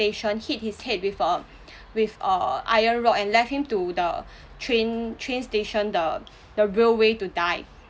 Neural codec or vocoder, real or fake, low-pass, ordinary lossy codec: none; real; none; none